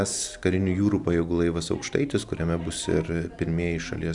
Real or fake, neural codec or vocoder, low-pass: real; none; 10.8 kHz